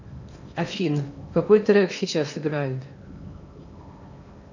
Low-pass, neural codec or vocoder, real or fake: 7.2 kHz; codec, 16 kHz in and 24 kHz out, 0.8 kbps, FocalCodec, streaming, 65536 codes; fake